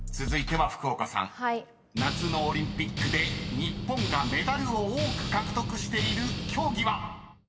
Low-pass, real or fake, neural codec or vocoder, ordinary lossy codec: none; real; none; none